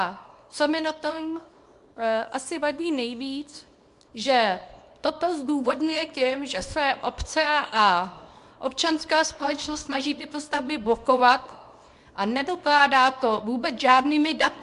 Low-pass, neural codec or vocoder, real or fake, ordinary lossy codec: 10.8 kHz; codec, 24 kHz, 0.9 kbps, WavTokenizer, small release; fake; MP3, 64 kbps